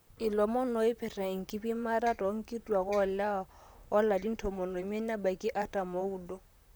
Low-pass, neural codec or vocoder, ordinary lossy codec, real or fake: none; vocoder, 44.1 kHz, 128 mel bands, Pupu-Vocoder; none; fake